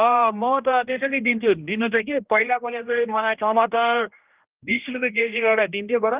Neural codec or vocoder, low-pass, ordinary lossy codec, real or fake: codec, 16 kHz, 1 kbps, X-Codec, HuBERT features, trained on general audio; 3.6 kHz; Opus, 32 kbps; fake